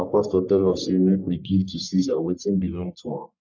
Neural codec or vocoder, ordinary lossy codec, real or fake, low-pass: codec, 44.1 kHz, 1.7 kbps, Pupu-Codec; none; fake; 7.2 kHz